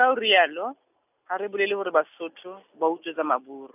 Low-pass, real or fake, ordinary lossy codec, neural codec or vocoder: 3.6 kHz; real; none; none